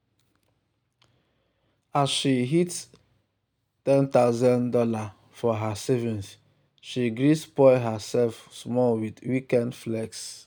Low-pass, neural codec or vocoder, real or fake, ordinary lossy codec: none; none; real; none